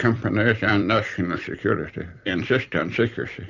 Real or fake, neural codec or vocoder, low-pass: fake; vocoder, 44.1 kHz, 128 mel bands every 256 samples, BigVGAN v2; 7.2 kHz